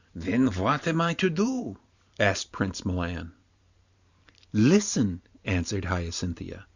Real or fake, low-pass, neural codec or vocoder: fake; 7.2 kHz; vocoder, 44.1 kHz, 128 mel bands every 512 samples, BigVGAN v2